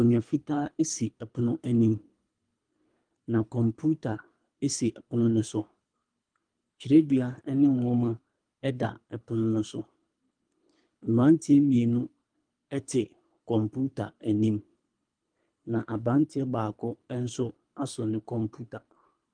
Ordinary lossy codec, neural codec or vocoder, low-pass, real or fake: Opus, 32 kbps; codec, 24 kHz, 3 kbps, HILCodec; 9.9 kHz; fake